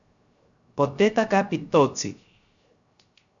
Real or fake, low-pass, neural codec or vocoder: fake; 7.2 kHz; codec, 16 kHz, 0.3 kbps, FocalCodec